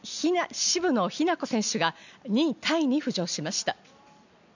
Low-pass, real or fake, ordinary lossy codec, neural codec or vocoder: 7.2 kHz; real; none; none